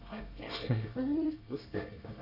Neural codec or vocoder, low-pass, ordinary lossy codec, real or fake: codec, 24 kHz, 1 kbps, SNAC; 5.4 kHz; none; fake